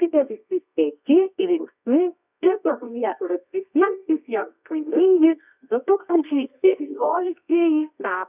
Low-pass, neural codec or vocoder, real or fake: 3.6 kHz; codec, 24 kHz, 0.9 kbps, WavTokenizer, medium music audio release; fake